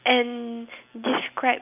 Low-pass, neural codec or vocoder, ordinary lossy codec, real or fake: 3.6 kHz; none; none; real